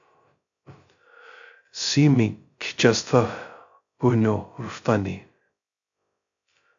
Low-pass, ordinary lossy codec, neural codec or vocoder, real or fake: 7.2 kHz; AAC, 64 kbps; codec, 16 kHz, 0.2 kbps, FocalCodec; fake